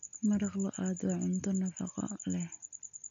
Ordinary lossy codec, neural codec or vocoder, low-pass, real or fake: none; none; 7.2 kHz; real